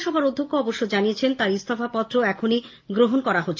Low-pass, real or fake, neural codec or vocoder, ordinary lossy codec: 7.2 kHz; real; none; Opus, 24 kbps